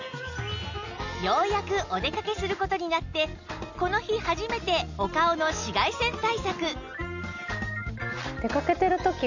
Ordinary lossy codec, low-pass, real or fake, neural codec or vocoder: none; 7.2 kHz; real; none